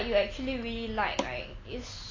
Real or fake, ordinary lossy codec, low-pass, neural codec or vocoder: real; AAC, 32 kbps; 7.2 kHz; none